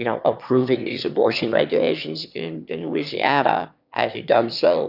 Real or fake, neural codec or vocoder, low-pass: fake; autoencoder, 22.05 kHz, a latent of 192 numbers a frame, VITS, trained on one speaker; 5.4 kHz